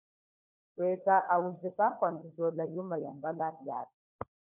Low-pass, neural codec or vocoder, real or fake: 3.6 kHz; codec, 16 kHz, 4 kbps, FunCodec, trained on LibriTTS, 50 frames a second; fake